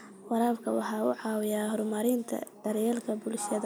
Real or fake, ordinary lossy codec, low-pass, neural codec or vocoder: real; none; none; none